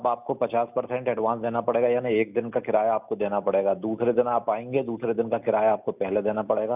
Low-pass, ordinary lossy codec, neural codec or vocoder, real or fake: 3.6 kHz; none; none; real